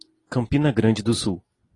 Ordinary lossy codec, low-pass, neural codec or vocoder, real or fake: AAC, 32 kbps; 10.8 kHz; none; real